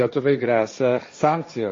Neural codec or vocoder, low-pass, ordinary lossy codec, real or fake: codec, 16 kHz, 1.1 kbps, Voila-Tokenizer; 7.2 kHz; MP3, 32 kbps; fake